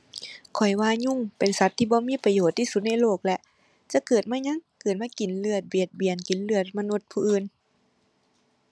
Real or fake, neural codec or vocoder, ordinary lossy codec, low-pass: real; none; none; none